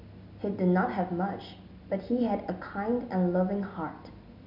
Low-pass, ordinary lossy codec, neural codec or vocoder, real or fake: 5.4 kHz; none; none; real